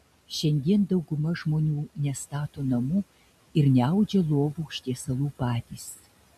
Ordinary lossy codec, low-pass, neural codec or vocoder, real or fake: MP3, 64 kbps; 14.4 kHz; none; real